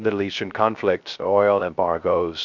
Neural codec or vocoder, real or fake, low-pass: codec, 16 kHz, 0.3 kbps, FocalCodec; fake; 7.2 kHz